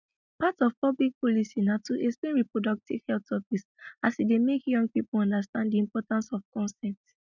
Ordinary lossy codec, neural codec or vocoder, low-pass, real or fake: none; none; 7.2 kHz; real